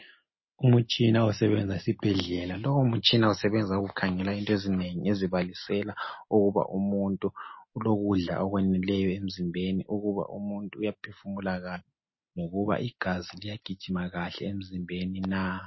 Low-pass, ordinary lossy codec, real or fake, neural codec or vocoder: 7.2 kHz; MP3, 24 kbps; real; none